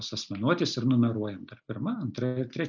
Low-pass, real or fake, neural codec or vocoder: 7.2 kHz; real; none